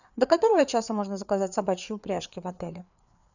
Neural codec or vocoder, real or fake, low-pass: codec, 16 kHz, 4 kbps, FreqCodec, larger model; fake; 7.2 kHz